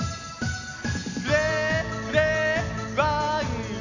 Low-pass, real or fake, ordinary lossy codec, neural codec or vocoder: 7.2 kHz; real; none; none